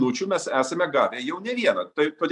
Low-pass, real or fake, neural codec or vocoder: 10.8 kHz; real; none